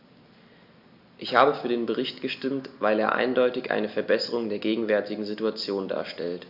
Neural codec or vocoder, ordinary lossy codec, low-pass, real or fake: none; AAC, 48 kbps; 5.4 kHz; real